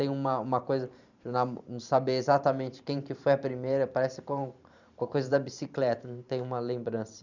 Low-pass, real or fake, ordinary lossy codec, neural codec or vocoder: 7.2 kHz; fake; none; vocoder, 44.1 kHz, 128 mel bands every 512 samples, BigVGAN v2